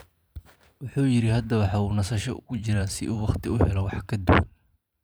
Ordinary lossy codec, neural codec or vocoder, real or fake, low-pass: none; vocoder, 44.1 kHz, 128 mel bands every 512 samples, BigVGAN v2; fake; none